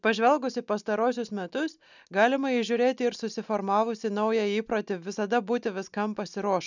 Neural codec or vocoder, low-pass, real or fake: none; 7.2 kHz; real